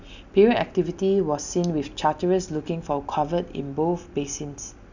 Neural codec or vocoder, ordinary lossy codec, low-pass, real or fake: none; none; 7.2 kHz; real